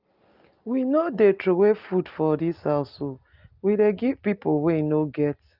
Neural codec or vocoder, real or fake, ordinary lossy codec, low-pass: none; real; Opus, 24 kbps; 5.4 kHz